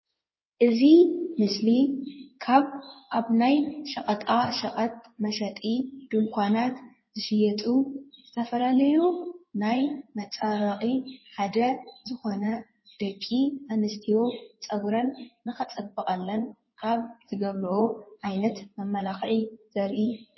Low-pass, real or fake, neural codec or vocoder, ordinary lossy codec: 7.2 kHz; fake; codec, 16 kHz in and 24 kHz out, 2.2 kbps, FireRedTTS-2 codec; MP3, 24 kbps